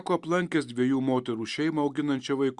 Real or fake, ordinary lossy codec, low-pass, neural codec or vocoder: real; Opus, 64 kbps; 10.8 kHz; none